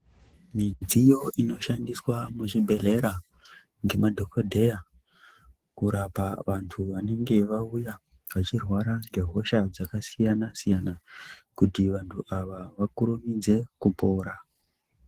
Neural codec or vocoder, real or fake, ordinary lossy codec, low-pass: autoencoder, 48 kHz, 128 numbers a frame, DAC-VAE, trained on Japanese speech; fake; Opus, 16 kbps; 14.4 kHz